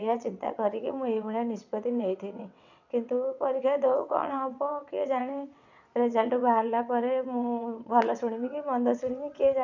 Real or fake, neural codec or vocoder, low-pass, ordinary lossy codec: fake; vocoder, 22.05 kHz, 80 mel bands, WaveNeXt; 7.2 kHz; none